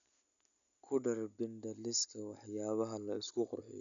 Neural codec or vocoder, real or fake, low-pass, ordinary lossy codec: none; real; 7.2 kHz; none